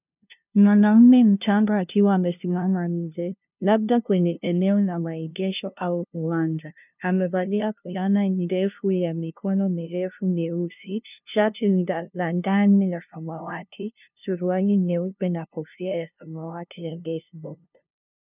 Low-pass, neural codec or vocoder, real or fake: 3.6 kHz; codec, 16 kHz, 0.5 kbps, FunCodec, trained on LibriTTS, 25 frames a second; fake